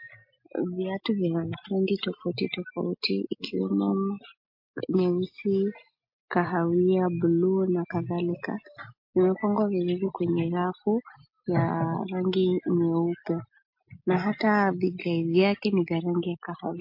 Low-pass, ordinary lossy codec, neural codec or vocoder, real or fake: 5.4 kHz; MP3, 32 kbps; none; real